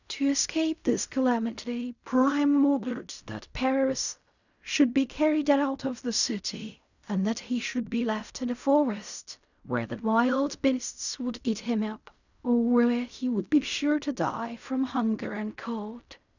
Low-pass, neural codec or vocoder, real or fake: 7.2 kHz; codec, 16 kHz in and 24 kHz out, 0.4 kbps, LongCat-Audio-Codec, fine tuned four codebook decoder; fake